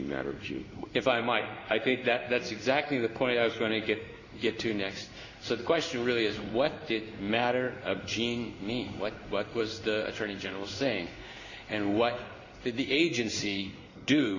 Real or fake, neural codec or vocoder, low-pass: fake; codec, 16 kHz in and 24 kHz out, 1 kbps, XY-Tokenizer; 7.2 kHz